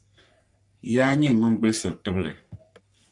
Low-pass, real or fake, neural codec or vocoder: 10.8 kHz; fake; codec, 44.1 kHz, 3.4 kbps, Pupu-Codec